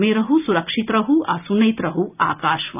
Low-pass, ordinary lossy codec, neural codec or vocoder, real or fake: 3.6 kHz; none; none; real